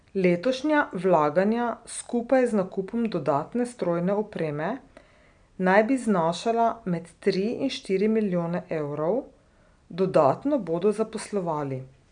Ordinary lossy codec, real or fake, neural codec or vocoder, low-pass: none; real; none; 9.9 kHz